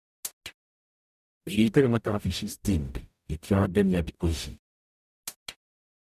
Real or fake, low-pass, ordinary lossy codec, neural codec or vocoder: fake; 14.4 kHz; none; codec, 44.1 kHz, 0.9 kbps, DAC